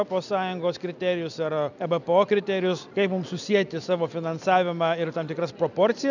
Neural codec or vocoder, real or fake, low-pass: none; real; 7.2 kHz